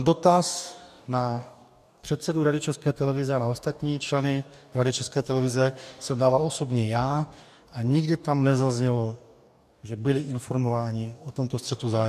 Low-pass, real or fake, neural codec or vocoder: 14.4 kHz; fake; codec, 44.1 kHz, 2.6 kbps, DAC